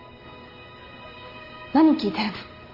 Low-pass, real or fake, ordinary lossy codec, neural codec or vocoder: 5.4 kHz; real; Opus, 24 kbps; none